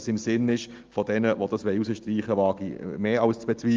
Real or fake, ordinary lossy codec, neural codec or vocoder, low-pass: real; Opus, 32 kbps; none; 7.2 kHz